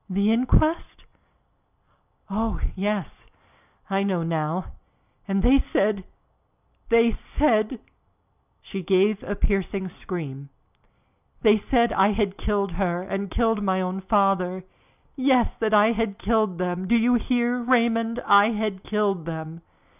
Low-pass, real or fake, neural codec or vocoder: 3.6 kHz; real; none